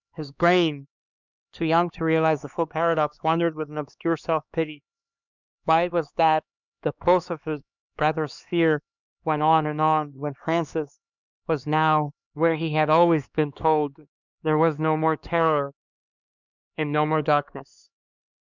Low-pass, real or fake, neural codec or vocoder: 7.2 kHz; fake; codec, 16 kHz, 2 kbps, X-Codec, HuBERT features, trained on LibriSpeech